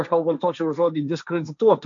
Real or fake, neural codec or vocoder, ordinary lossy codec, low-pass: fake; codec, 16 kHz, 1.1 kbps, Voila-Tokenizer; MP3, 64 kbps; 7.2 kHz